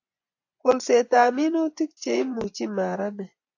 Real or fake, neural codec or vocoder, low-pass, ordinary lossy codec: fake; vocoder, 24 kHz, 100 mel bands, Vocos; 7.2 kHz; AAC, 48 kbps